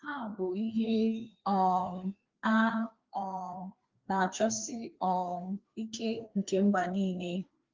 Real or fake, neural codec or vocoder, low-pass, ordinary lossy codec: fake; codec, 16 kHz, 2 kbps, FreqCodec, larger model; 7.2 kHz; Opus, 32 kbps